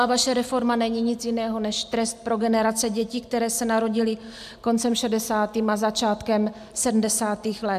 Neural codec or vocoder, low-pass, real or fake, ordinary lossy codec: none; 14.4 kHz; real; AAC, 96 kbps